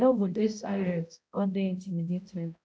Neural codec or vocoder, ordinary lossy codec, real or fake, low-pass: codec, 16 kHz, 0.5 kbps, X-Codec, HuBERT features, trained on balanced general audio; none; fake; none